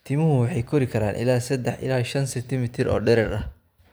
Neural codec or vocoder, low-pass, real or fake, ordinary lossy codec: vocoder, 44.1 kHz, 128 mel bands every 256 samples, BigVGAN v2; none; fake; none